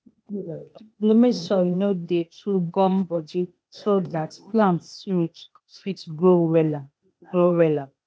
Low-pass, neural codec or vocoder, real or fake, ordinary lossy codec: none; codec, 16 kHz, 0.8 kbps, ZipCodec; fake; none